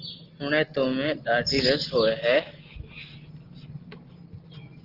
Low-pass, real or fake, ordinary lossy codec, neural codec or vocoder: 5.4 kHz; real; Opus, 16 kbps; none